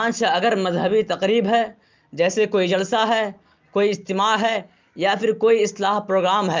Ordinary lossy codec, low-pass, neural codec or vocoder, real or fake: Opus, 24 kbps; 7.2 kHz; none; real